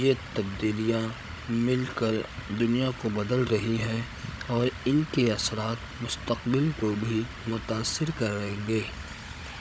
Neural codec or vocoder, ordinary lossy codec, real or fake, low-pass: codec, 16 kHz, 16 kbps, FunCodec, trained on Chinese and English, 50 frames a second; none; fake; none